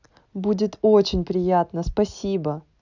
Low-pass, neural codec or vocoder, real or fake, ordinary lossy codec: 7.2 kHz; none; real; none